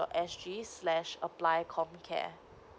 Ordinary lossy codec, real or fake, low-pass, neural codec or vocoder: none; real; none; none